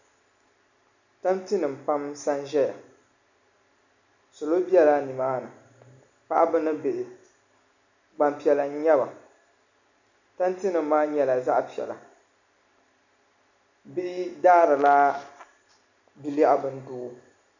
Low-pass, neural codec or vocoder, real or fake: 7.2 kHz; none; real